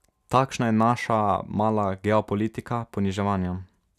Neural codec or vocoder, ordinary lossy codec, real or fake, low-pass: none; none; real; 14.4 kHz